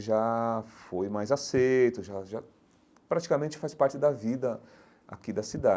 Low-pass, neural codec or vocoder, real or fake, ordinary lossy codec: none; none; real; none